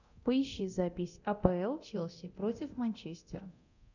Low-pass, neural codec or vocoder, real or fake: 7.2 kHz; codec, 24 kHz, 0.9 kbps, DualCodec; fake